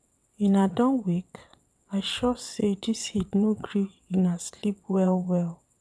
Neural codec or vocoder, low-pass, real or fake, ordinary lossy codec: vocoder, 22.05 kHz, 80 mel bands, WaveNeXt; none; fake; none